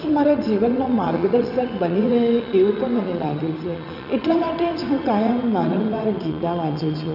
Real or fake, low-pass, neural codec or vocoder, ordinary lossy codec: fake; 5.4 kHz; vocoder, 22.05 kHz, 80 mel bands, WaveNeXt; none